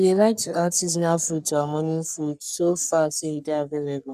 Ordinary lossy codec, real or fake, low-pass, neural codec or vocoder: none; fake; 14.4 kHz; codec, 44.1 kHz, 3.4 kbps, Pupu-Codec